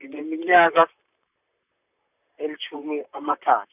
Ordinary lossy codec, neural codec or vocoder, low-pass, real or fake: none; vocoder, 44.1 kHz, 128 mel bands, Pupu-Vocoder; 3.6 kHz; fake